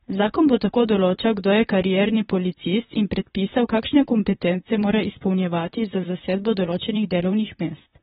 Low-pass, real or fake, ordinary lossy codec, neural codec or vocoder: 19.8 kHz; fake; AAC, 16 kbps; vocoder, 44.1 kHz, 128 mel bands, Pupu-Vocoder